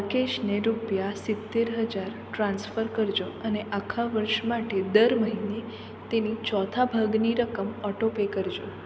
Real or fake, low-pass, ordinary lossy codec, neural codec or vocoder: real; none; none; none